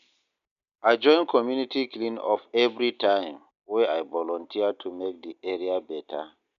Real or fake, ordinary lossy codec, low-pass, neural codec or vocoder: real; none; 7.2 kHz; none